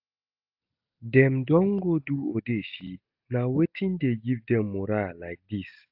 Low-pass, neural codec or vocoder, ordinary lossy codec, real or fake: 5.4 kHz; none; AAC, 48 kbps; real